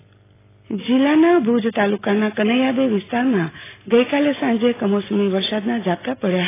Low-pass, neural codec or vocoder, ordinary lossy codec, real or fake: 3.6 kHz; none; AAC, 16 kbps; real